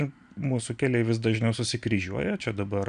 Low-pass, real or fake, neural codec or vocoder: 9.9 kHz; real; none